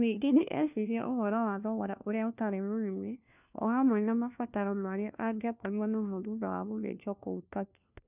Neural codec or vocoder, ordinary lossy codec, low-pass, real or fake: codec, 16 kHz, 1 kbps, FunCodec, trained on LibriTTS, 50 frames a second; none; 3.6 kHz; fake